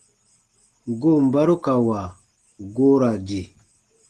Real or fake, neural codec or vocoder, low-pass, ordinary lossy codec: real; none; 10.8 kHz; Opus, 16 kbps